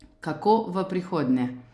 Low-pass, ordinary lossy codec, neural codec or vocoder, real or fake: none; none; none; real